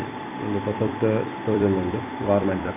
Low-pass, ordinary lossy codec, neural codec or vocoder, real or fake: 3.6 kHz; MP3, 32 kbps; vocoder, 44.1 kHz, 128 mel bands every 256 samples, BigVGAN v2; fake